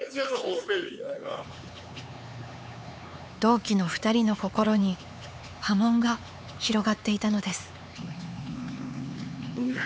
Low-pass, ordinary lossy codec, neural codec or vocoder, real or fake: none; none; codec, 16 kHz, 4 kbps, X-Codec, HuBERT features, trained on LibriSpeech; fake